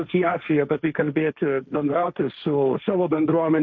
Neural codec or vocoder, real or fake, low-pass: codec, 16 kHz, 1.1 kbps, Voila-Tokenizer; fake; 7.2 kHz